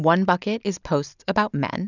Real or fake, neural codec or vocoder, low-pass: real; none; 7.2 kHz